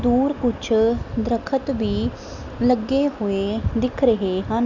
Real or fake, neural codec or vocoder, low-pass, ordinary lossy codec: real; none; 7.2 kHz; none